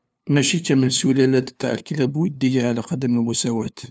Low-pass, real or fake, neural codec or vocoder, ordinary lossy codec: none; fake; codec, 16 kHz, 2 kbps, FunCodec, trained on LibriTTS, 25 frames a second; none